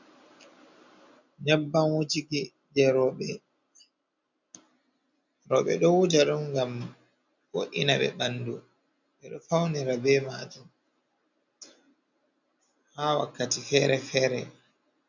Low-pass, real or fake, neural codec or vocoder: 7.2 kHz; real; none